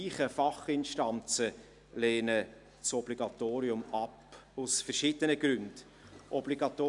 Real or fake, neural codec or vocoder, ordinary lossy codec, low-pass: real; none; none; 10.8 kHz